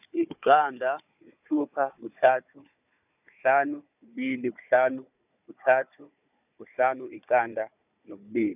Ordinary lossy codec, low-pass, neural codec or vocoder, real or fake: none; 3.6 kHz; codec, 16 kHz, 4 kbps, FunCodec, trained on Chinese and English, 50 frames a second; fake